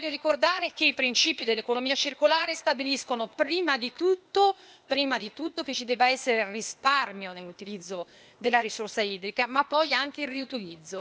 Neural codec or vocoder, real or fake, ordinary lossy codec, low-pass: codec, 16 kHz, 0.8 kbps, ZipCodec; fake; none; none